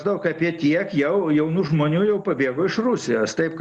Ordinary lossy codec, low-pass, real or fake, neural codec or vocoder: Opus, 64 kbps; 10.8 kHz; real; none